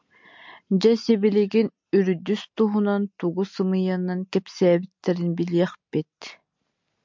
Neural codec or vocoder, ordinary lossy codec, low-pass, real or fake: none; MP3, 64 kbps; 7.2 kHz; real